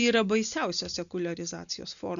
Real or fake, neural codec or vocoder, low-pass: real; none; 7.2 kHz